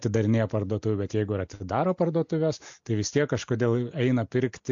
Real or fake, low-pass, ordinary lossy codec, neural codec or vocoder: real; 7.2 kHz; AAC, 64 kbps; none